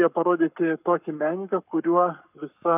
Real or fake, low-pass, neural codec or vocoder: fake; 3.6 kHz; codec, 44.1 kHz, 7.8 kbps, Pupu-Codec